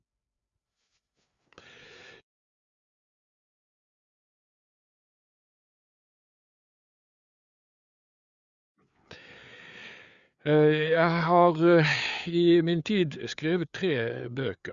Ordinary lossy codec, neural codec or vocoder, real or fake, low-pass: Opus, 64 kbps; codec, 16 kHz, 4 kbps, FreqCodec, larger model; fake; 7.2 kHz